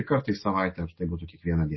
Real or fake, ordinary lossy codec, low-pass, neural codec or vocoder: real; MP3, 24 kbps; 7.2 kHz; none